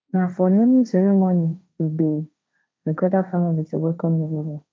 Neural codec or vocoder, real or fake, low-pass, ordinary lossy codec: codec, 16 kHz, 1.1 kbps, Voila-Tokenizer; fake; none; none